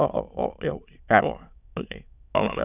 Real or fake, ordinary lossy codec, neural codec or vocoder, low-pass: fake; none; autoencoder, 22.05 kHz, a latent of 192 numbers a frame, VITS, trained on many speakers; 3.6 kHz